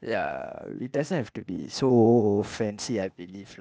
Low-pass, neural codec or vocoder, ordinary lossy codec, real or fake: none; codec, 16 kHz, 0.8 kbps, ZipCodec; none; fake